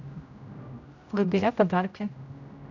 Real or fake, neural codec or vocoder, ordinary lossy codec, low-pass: fake; codec, 16 kHz, 0.5 kbps, X-Codec, HuBERT features, trained on general audio; none; 7.2 kHz